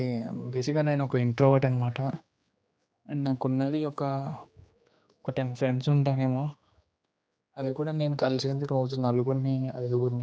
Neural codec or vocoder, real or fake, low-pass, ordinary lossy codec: codec, 16 kHz, 2 kbps, X-Codec, HuBERT features, trained on general audio; fake; none; none